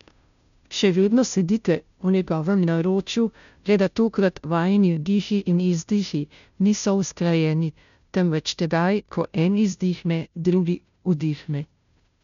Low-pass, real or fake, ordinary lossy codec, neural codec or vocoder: 7.2 kHz; fake; none; codec, 16 kHz, 0.5 kbps, FunCodec, trained on Chinese and English, 25 frames a second